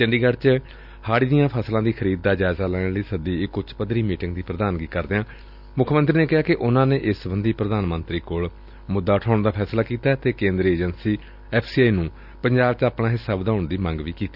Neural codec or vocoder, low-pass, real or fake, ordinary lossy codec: none; 5.4 kHz; real; none